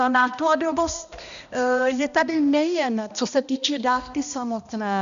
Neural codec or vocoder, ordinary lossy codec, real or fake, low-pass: codec, 16 kHz, 1 kbps, X-Codec, HuBERT features, trained on balanced general audio; MP3, 96 kbps; fake; 7.2 kHz